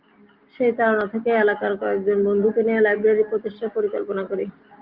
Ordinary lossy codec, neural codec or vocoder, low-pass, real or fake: Opus, 24 kbps; none; 5.4 kHz; real